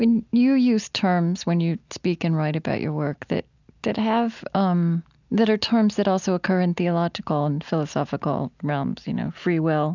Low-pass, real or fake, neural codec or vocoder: 7.2 kHz; real; none